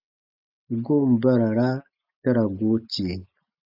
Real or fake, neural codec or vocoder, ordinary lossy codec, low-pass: real; none; AAC, 48 kbps; 5.4 kHz